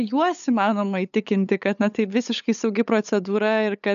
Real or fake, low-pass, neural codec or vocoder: fake; 7.2 kHz; codec, 16 kHz, 8 kbps, FunCodec, trained on LibriTTS, 25 frames a second